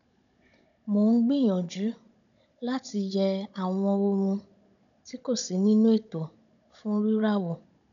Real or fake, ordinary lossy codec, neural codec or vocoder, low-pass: fake; none; codec, 16 kHz, 16 kbps, FunCodec, trained on Chinese and English, 50 frames a second; 7.2 kHz